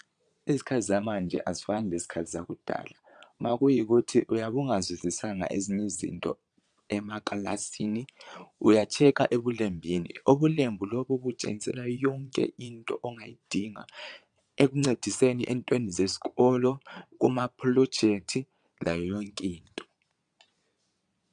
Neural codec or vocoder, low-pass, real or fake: vocoder, 22.05 kHz, 80 mel bands, Vocos; 9.9 kHz; fake